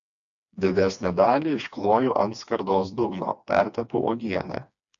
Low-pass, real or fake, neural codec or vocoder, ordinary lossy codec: 7.2 kHz; fake; codec, 16 kHz, 2 kbps, FreqCodec, smaller model; AAC, 64 kbps